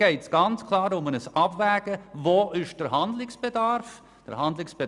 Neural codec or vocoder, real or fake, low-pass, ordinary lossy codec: none; real; 10.8 kHz; none